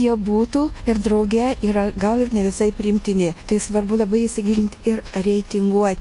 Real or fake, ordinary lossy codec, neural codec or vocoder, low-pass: fake; AAC, 48 kbps; codec, 24 kHz, 1.2 kbps, DualCodec; 10.8 kHz